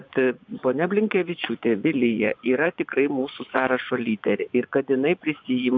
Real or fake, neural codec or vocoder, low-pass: fake; vocoder, 24 kHz, 100 mel bands, Vocos; 7.2 kHz